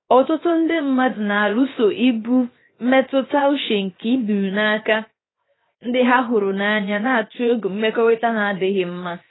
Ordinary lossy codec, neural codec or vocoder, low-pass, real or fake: AAC, 16 kbps; codec, 16 kHz, 0.7 kbps, FocalCodec; 7.2 kHz; fake